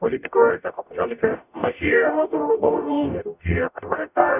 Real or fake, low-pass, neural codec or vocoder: fake; 3.6 kHz; codec, 44.1 kHz, 0.9 kbps, DAC